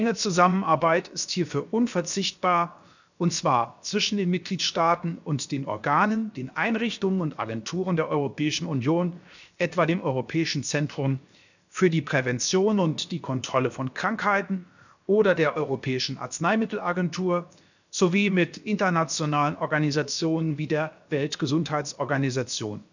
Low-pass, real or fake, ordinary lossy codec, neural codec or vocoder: 7.2 kHz; fake; none; codec, 16 kHz, 0.7 kbps, FocalCodec